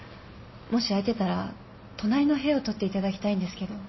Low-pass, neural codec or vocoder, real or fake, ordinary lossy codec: 7.2 kHz; none; real; MP3, 24 kbps